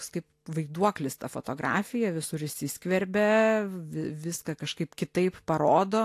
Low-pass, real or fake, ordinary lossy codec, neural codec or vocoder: 14.4 kHz; real; AAC, 64 kbps; none